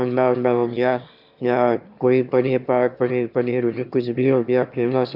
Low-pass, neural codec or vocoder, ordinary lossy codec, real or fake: 5.4 kHz; autoencoder, 22.05 kHz, a latent of 192 numbers a frame, VITS, trained on one speaker; AAC, 48 kbps; fake